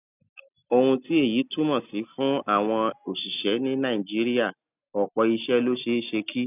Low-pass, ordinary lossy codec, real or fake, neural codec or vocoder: 3.6 kHz; none; real; none